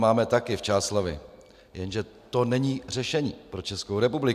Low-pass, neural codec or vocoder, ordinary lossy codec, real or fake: 14.4 kHz; none; AAC, 96 kbps; real